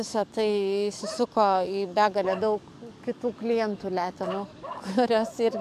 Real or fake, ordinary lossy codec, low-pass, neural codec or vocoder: fake; AAC, 96 kbps; 14.4 kHz; codec, 44.1 kHz, 7.8 kbps, DAC